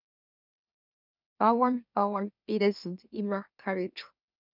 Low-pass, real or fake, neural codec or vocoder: 5.4 kHz; fake; autoencoder, 44.1 kHz, a latent of 192 numbers a frame, MeloTTS